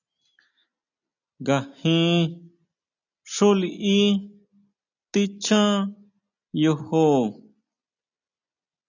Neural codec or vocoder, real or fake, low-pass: none; real; 7.2 kHz